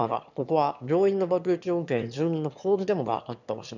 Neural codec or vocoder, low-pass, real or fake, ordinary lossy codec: autoencoder, 22.05 kHz, a latent of 192 numbers a frame, VITS, trained on one speaker; 7.2 kHz; fake; none